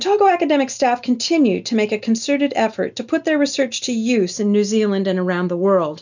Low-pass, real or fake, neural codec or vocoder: 7.2 kHz; real; none